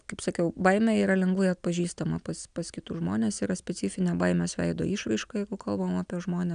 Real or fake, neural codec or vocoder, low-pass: real; none; 9.9 kHz